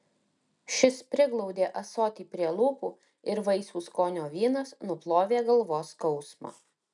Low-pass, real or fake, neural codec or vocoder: 10.8 kHz; real; none